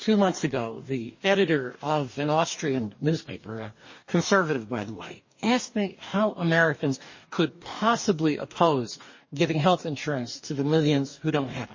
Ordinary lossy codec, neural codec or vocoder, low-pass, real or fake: MP3, 32 kbps; codec, 44.1 kHz, 2.6 kbps, DAC; 7.2 kHz; fake